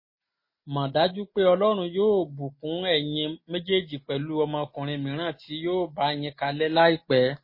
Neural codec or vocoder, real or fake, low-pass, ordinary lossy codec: none; real; 5.4 kHz; MP3, 24 kbps